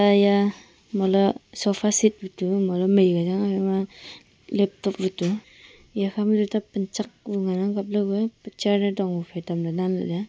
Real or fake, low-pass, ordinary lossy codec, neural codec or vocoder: real; none; none; none